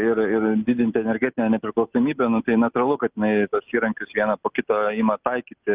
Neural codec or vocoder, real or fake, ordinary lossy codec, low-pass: none; real; Opus, 32 kbps; 3.6 kHz